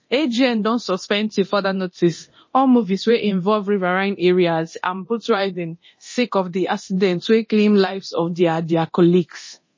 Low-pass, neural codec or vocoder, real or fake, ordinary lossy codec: 7.2 kHz; codec, 24 kHz, 0.9 kbps, DualCodec; fake; MP3, 32 kbps